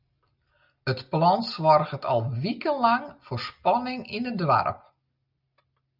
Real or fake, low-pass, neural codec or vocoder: real; 5.4 kHz; none